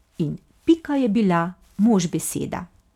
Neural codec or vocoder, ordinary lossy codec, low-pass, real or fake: none; none; 19.8 kHz; real